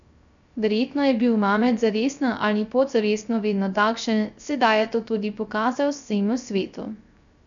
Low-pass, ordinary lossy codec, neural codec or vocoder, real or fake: 7.2 kHz; none; codec, 16 kHz, 0.3 kbps, FocalCodec; fake